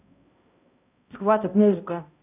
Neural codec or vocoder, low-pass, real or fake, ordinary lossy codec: codec, 16 kHz, 0.5 kbps, X-Codec, HuBERT features, trained on balanced general audio; 3.6 kHz; fake; none